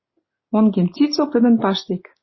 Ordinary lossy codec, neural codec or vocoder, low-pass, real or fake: MP3, 24 kbps; none; 7.2 kHz; real